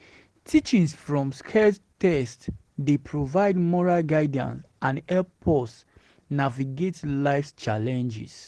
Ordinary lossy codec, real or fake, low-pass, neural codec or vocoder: Opus, 16 kbps; real; 10.8 kHz; none